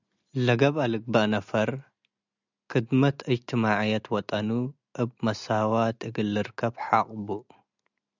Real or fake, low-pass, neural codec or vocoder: real; 7.2 kHz; none